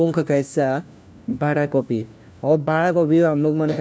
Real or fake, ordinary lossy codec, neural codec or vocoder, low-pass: fake; none; codec, 16 kHz, 1 kbps, FunCodec, trained on LibriTTS, 50 frames a second; none